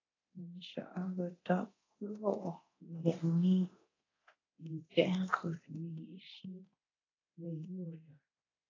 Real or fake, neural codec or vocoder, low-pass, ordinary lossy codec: fake; codec, 24 kHz, 0.9 kbps, DualCodec; 7.2 kHz; AAC, 32 kbps